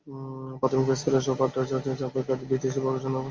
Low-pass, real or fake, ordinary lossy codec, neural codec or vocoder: 7.2 kHz; real; Opus, 32 kbps; none